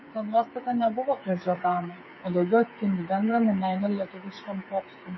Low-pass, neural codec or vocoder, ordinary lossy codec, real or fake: 7.2 kHz; codec, 16 kHz, 8 kbps, FreqCodec, smaller model; MP3, 24 kbps; fake